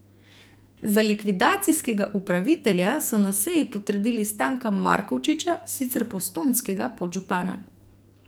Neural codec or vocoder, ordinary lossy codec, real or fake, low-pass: codec, 44.1 kHz, 2.6 kbps, SNAC; none; fake; none